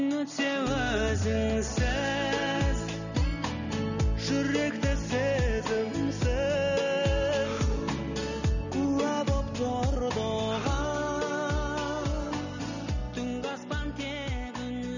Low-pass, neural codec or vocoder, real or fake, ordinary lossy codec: 7.2 kHz; none; real; none